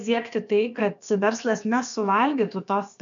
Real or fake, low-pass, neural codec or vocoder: fake; 7.2 kHz; codec, 16 kHz, about 1 kbps, DyCAST, with the encoder's durations